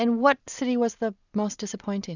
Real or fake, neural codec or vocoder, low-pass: real; none; 7.2 kHz